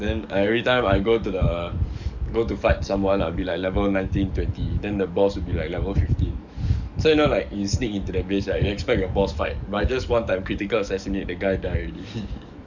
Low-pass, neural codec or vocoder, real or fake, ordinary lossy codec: 7.2 kHz; codec, 44.1 kHz, 7.8 kbps, DAC; fake; none